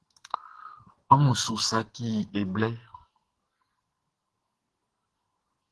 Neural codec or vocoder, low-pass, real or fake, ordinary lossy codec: codec, 44.1 kHz, 2.6 kbps, SNAC; 10.8 kHz; fake; Opus, 16 kbps